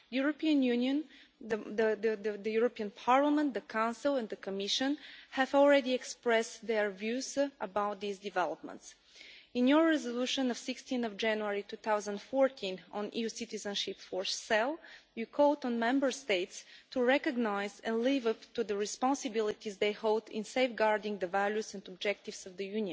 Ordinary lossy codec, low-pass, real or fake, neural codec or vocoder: none; none; real; none